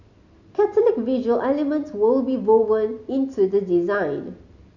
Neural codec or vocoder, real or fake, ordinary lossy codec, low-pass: none; real; none; 7.2 kHz